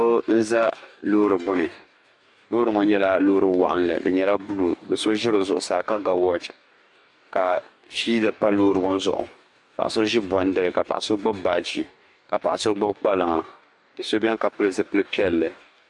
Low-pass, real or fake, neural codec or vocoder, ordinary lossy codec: 10.8 kHz; fake; codec, 44.1 kHz, 2.6 kbps, DAC; MP3, 64 kbps